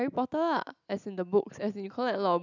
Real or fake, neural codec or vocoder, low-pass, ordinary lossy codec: real; none; 7.2 kHz; none